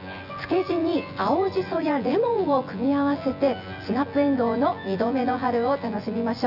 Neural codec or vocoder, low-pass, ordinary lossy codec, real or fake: vocoder, 24 kHz, 100 mel bands, Vocos; 5.4 kHz; none; fake